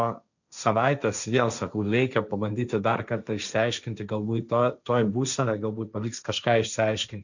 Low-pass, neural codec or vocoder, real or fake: 7.2 kHz; codec, 16 kHz, 1.1 kbps, Voila-Tokenizer; fake